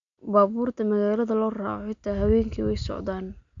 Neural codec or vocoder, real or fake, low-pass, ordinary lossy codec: none; real; 7.2 kHz; MP3, 64 kbps